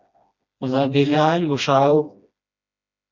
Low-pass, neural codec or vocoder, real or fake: 7.2 kHz; codec, 16 kHz, 1 kbps, FreqCodec, smaller model; fake